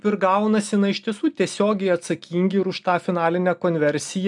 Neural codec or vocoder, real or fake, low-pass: none; real; 10.8 kHz